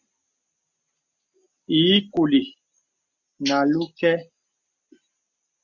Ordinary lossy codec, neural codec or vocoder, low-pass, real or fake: MP3, 64 kbps; none; 7.2 kHz; real